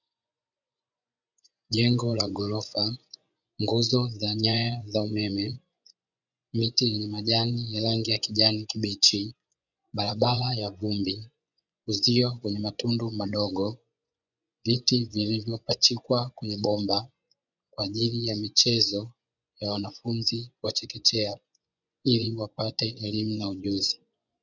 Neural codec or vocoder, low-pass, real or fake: vocoder, 24 kHz, 100 mel bands, Vocos; 7.2 kHz; fake